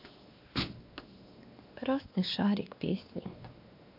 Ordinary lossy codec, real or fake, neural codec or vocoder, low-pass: MP3, 48 kbps; fake; codec, 16 kHz, 2 kbps, X-Codec, WavLM features, trained on Multilingual LibriSpeech; 5.4 kHz